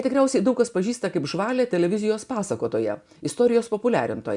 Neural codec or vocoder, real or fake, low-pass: none; real; 10.8 kHz